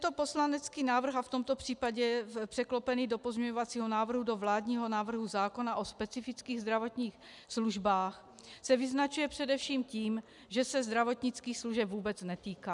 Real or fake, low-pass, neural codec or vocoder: real; 10.8 kHz; none